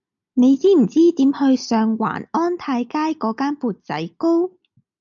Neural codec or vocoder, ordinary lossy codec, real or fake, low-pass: none; MP3, 64 kbps; real; 7.2 kHz